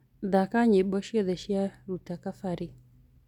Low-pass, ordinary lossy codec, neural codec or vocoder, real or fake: 19.8 kHz; none; none; real